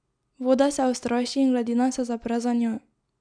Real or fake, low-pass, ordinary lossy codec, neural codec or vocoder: real; 9.9 kHz; none; none